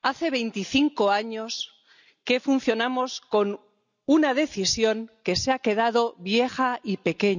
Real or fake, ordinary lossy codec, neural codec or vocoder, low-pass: real; none; none; 7.2 kHz